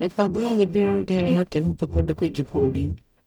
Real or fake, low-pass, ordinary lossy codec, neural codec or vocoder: fake; 19.8 kHz; none; codec, 44.1 kHz, 0.9 kbps, DAC